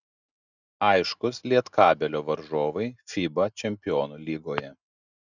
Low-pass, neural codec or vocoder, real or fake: 7.2 kHz; none; real